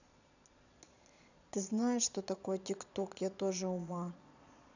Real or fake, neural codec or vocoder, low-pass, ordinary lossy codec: fake; vocoder, 22.05 kHz, 80 mel bands, Vocos; 7.2 kHz; none